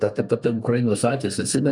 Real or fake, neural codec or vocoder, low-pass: fake; codec, 32 kHz, 1.9 kbps, SNAC; 10.8 kHz